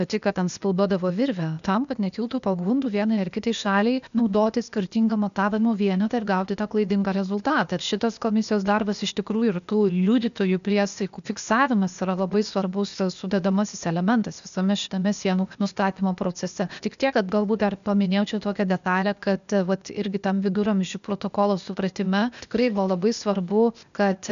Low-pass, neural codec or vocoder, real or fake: 7.2 kHz; codec, 16 kHz, 0.8 kbps, ZipCodec; fake